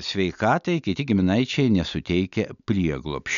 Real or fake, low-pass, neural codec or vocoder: real; 7.2 kHz; none